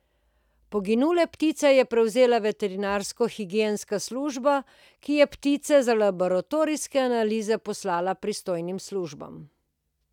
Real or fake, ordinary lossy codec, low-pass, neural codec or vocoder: real; none; 19.8 kHz; none